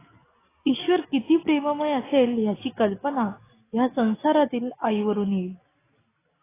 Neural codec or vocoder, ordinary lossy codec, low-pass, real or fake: none; AAC, 16 kbps; 3.6 kHz; real